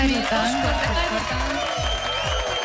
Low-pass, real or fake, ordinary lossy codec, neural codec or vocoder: none; real; none; none